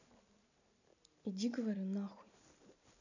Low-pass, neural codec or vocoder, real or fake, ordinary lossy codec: 7.2 kHz; none; real; none